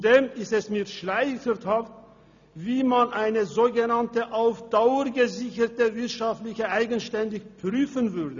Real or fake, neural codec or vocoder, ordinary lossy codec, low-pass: real; none; none; 7.2 kHz